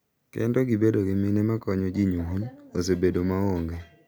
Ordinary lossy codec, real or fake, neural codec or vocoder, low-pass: none; real; none; none